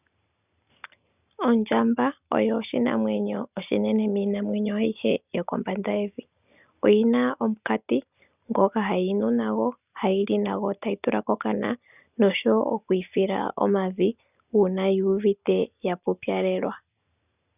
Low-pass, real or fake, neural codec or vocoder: 3.6 kHz; real; none